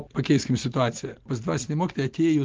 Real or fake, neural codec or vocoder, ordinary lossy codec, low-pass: real; none; Opus, 16 kbps; 7.2 kHz